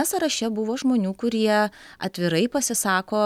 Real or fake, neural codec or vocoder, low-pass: real; none; 19.8 kHz